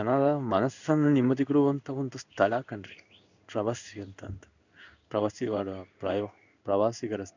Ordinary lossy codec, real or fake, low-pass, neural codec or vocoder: none; fake; 7.2 kHz; codec, 16 kHz in and 24 kHz out, 1 kbps, XY-Tokenizer